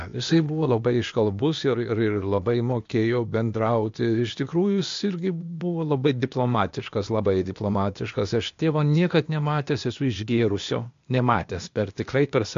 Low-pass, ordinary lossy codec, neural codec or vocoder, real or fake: 7.2 kHz; MP3, 48 kbps; codec, 16 kHz, 0.8 kbps, ZipCodec; fake